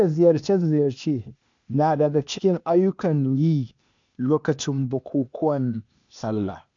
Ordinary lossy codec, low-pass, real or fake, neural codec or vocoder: MP3, 64 kbps; 7.2 kHz; fake; codec, 16 kHz, 0.8 kbps, ZipCodec